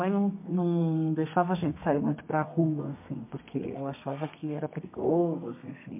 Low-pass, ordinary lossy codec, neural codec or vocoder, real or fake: 3.6 kHz; MP3, 32 kbps; codec, 32 kHz, 1.9 kbps, SNAC; fake